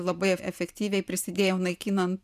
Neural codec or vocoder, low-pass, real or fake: vocoder, 44.1 kHz, 128 mel bands every 512 samples, BigVGAN v2; 14.4 kHz; fake